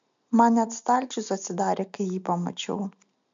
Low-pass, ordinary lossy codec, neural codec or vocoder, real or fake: 7.2 kHz; MP3, 64 kbps; none; real